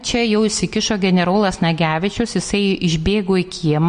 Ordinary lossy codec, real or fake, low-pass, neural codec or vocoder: MP3, 48 kbps; real; 9.9 kHz; none